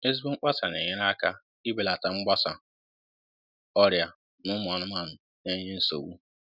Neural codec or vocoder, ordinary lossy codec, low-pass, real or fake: none; none; 5.4 kHz; real